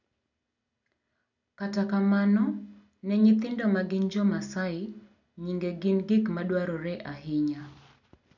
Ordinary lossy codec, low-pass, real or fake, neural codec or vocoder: none; 7.2 kHz; real; none